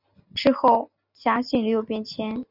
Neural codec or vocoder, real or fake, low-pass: none; real; 5.4 kHz